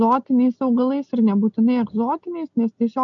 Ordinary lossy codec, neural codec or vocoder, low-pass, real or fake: MP3, 64 kbps; none; 7.2 kHz; real